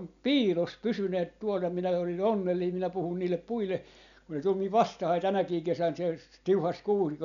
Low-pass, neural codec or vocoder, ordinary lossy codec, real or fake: 7.2 kHz; none; none; real